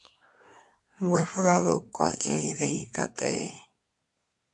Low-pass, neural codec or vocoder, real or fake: 10.8 kHz; codec, 24 kHz, 1 kbps, SNAC; fake